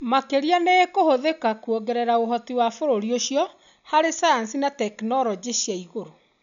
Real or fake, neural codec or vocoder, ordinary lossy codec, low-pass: real; none; none; 7.2 kHz